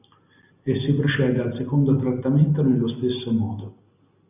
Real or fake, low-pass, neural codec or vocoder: real; 3.6 kHz; none